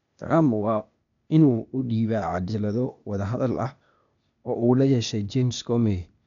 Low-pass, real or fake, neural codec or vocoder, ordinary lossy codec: 7.2 kHz; fake; codec, 16 kHz, 0.8 kbps, ZipCodec; none